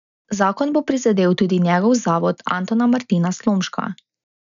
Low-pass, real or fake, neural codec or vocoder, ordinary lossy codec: 7.2 kHz; real; none; none